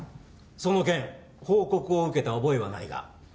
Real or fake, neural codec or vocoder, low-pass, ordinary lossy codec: real; none; none; none